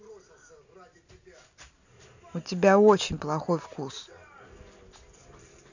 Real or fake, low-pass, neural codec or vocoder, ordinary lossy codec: real; 7.2 kHz; none; none